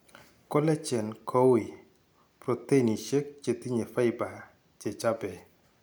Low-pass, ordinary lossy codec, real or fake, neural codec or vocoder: none; none; real; none